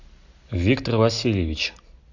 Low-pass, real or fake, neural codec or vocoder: 7.2 kHz; real; none